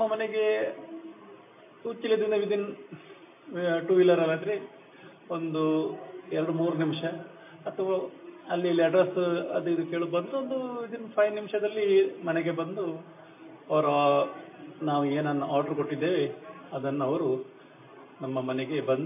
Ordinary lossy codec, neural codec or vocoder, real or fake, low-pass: MP3, 24 kbps; none; real; 3.6 kHz